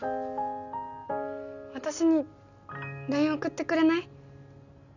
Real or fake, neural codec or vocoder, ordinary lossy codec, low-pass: real; none; none; 7.2 kHz